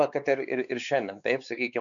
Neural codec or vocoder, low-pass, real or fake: codec, 16 kHz, 4 kbps, X-Codec, WavLM features, trained on Multilingual LibriSpeech; 7.2 kHz; fake